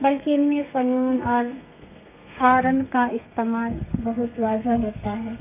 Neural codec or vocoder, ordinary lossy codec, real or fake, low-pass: codec, 32 kHz, 1.9 kbps, SNAC; none; fake; 3.6 kHz